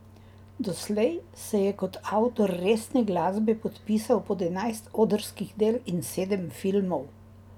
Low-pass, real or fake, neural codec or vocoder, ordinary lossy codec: 19.8 kHz; real; none; none